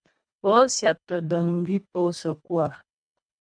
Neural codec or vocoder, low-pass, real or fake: codec, 24 kHz, 1.5 kbps, HILCodec; 9.9 kHz; fake